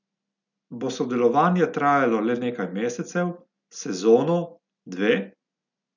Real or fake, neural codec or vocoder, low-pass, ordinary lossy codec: real; none; 7.2 kHz; none